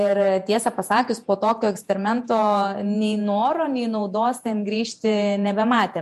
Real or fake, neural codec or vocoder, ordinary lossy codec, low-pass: fake; vocoder, 48 kHz, 128 mel bands, Vocos; MP3, 64 kbps; 14.4 kHz